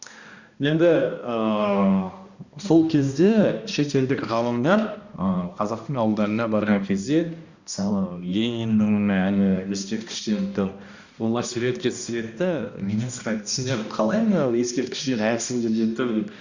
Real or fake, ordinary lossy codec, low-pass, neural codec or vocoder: fake; Opus, 64 kbps; 7.2 kHz; codec, 16 kHz, 1 kbps, X-Codec, HuBERT features, trained on balanced general audio